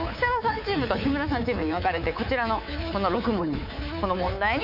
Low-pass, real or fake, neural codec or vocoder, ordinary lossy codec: 5.4 kHz; fake; codec, 24 kHz, 3.1 kbps, DualCodec; none